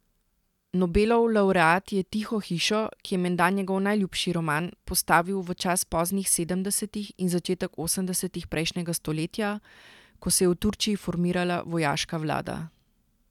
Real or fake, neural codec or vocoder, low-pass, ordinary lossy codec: real; none; 19.8 kHz; none